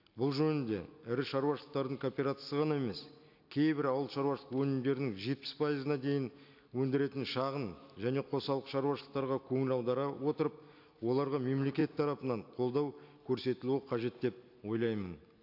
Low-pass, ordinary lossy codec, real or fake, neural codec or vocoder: 5.4 kHz; AAC, 48 kbps; real; none